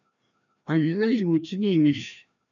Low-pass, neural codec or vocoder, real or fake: 7.2 kHz; codec, 16 kHz, 1 kbps, FreqCodec, larger model; fake